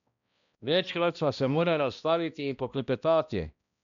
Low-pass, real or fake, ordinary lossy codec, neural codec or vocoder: 7.2 kHz; fake; MP3, 96 kbps; codec, 16 kHz, 1 kbps, X-Codec, HuBERT features, trained on balanced general audio